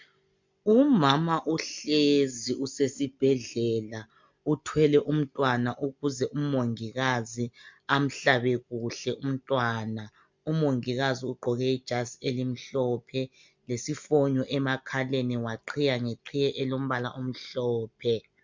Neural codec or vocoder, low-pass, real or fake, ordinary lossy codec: none; 7.2 kHz; real; MP3, 64 kbps